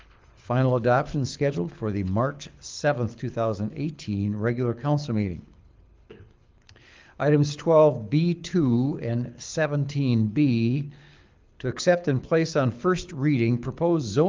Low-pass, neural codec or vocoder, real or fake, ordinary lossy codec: 7.2 kHz; codec, 24 kHz, 6 kbps, HILCodec; fake; Opus, 32 kbps